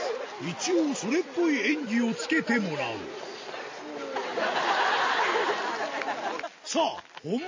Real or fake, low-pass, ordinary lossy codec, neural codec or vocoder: real; 7.2 kHz; MP3, 32 kbps; none